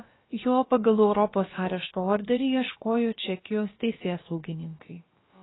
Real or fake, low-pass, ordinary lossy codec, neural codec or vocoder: fake; 7.2 kHz; AAC, 16 kbps; codec, 16 kHz, about 1 kbps, DyCAST, with the encoder's durations